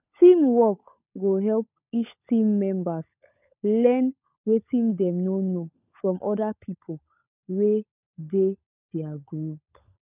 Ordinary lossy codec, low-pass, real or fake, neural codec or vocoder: none; 3.6 kHz; fake; codec, 16 kHz, 16 kbps, FunCodec, trained on LibriTTS, 50 frames a second